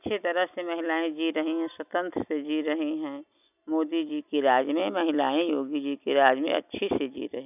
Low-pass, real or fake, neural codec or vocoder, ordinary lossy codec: 3.6 kHz; real; none; none